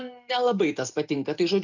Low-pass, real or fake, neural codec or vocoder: 7.2 kHz; real; none